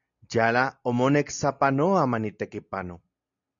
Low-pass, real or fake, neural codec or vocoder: 7.2 kHz; real; none